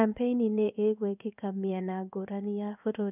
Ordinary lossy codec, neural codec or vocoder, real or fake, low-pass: none; codec, 16 kHz in and 24 kHz out, 1 kbps, XY-Tokenizer; fake; 3.6 kHz